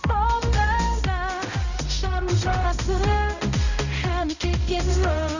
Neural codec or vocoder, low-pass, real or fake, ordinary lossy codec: codec, 16 kHz, 0.5 kbps, X-Codec, HuBERT features, trained on balanced general audio; 7.2 kHz; fake; none